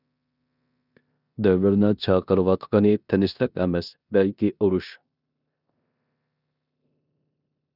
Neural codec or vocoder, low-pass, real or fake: codec, 16 kHz in and 24 kHz out, 0.9 kbps, LongCat-Audio-Codec, four codebook decoder; 5.4 kHz; fake